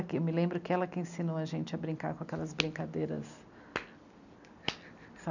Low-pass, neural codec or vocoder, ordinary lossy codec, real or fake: 7.2 kHz; none; none; real